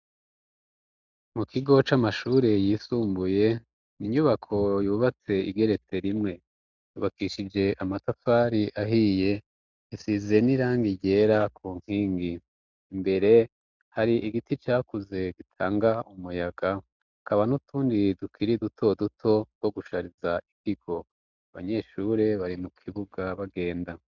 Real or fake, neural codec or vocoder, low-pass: real; none; 7.2 kHz